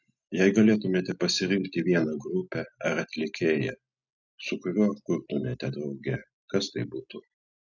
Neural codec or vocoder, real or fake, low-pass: none; real; 7.2 kHz